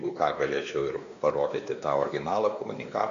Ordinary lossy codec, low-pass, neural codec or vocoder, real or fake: AAC, 64 kbps; 7.2 kHz; codec, 16 kHz, 2 kbps, X-Codec, WavLM features, trained on Multilingual LibriSpeech; fake